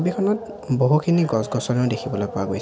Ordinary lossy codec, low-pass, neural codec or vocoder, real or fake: none; none; none; real